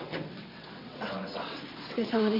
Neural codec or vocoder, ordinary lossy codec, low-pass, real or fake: vocoder, 22.05 kHz, 80 mel bands, WaveNeXt; MP3, 24 kbps; 5.4 kHz; fake